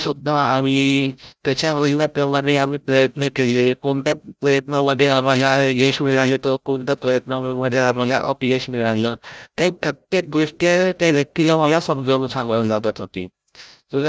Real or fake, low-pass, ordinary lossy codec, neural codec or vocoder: fake; none; none; codec, 16 kHz, 0.5 kbps, FreqCodec, larger model